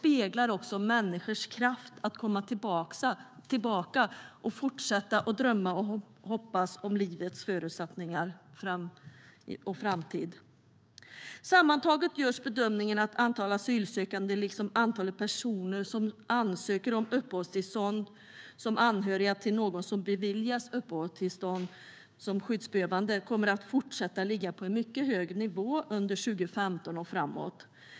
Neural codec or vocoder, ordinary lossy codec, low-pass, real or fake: codec, 16 kHz, 6 kbps, DAC; none; none; fake